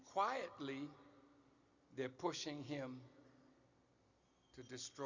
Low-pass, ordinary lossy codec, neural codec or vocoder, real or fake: 7.2 kHz; Opus, 64 kbps; none; real